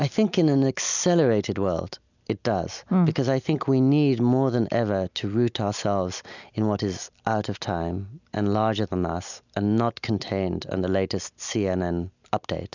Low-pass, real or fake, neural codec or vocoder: 7.2 kHz; real; none